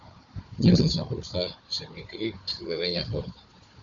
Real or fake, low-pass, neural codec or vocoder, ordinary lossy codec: fake; 7.2 kHz; codec, 16 kHz, 4 kbps, FunCodec, trained on Chinese and English, 50 frames a second; Opus, 64 kbps